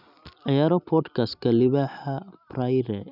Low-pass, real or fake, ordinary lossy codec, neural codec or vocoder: 5.4 kHz; real; none; none